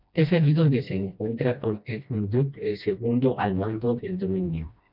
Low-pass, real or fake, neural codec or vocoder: 5.4 kHz; fake; codec, 16 kHz, 1 kbps, FreqCodec, smaller model